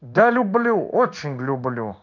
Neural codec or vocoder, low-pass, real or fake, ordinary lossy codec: codec, 16 kHz in and 24 kHz out, 1 kbps, XY-Tokenizer; 7.2 kHz; fake; none